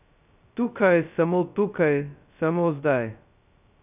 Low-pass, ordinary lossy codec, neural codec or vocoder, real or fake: 3.6 kHz; none; codec, 16 kHz, 0.2 kbps, FocalCodec; fake